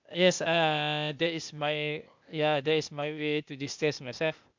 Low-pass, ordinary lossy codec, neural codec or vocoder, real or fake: 7.2 kHz; MP3, 64 kbps; codec, 16 kHz, 0.8 kbps, ZipCodec; fake